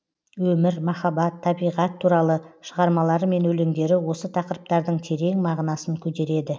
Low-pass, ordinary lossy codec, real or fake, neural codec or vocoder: none; none; real; none